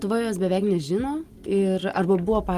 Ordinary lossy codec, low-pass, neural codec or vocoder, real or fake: Opus, 24 kbps; 14.4 kHz; none; real